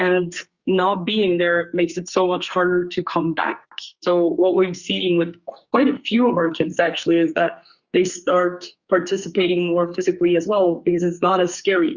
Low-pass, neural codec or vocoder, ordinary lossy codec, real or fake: 7.2 kHz; codec, 44.1 kHz, 2.6 kbps, SNAC; Opus, 64 kbps; fake